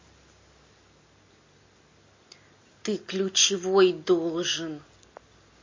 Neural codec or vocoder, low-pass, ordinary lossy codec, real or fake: none; 7.2 kHz; MP3, 32 kbps; real